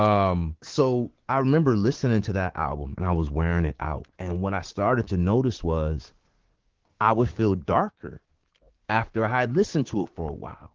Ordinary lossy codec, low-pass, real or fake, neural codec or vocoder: Opus, 16 kbps; 7.2 kHz; fake; codec, 16 kHz, 6 kbps, DAC